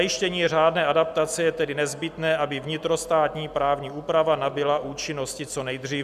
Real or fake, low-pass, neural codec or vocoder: real; 14.4 kHz; none